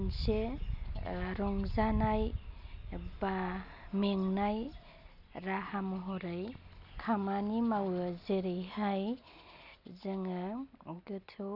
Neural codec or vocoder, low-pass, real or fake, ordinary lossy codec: none; 5.4 kHz; real; none